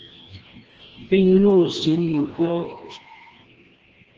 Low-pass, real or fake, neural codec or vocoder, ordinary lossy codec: 7.2 kHz; fake; codec, 16 kHz, 1 kbps, FreqCodec, larger model; Opus, 16 kbps